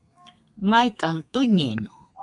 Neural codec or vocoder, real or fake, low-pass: codec, 32 kHz, 1.9 kbps, SNAC; fake; 10.8 kHz